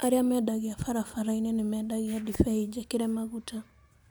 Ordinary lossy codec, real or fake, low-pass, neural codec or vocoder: none; real; none; none